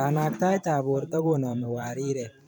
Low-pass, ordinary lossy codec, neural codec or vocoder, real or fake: none; none; vocoder, 44.1 kHz, 128 mel bands every 512 samples, BigVGAN v2; fake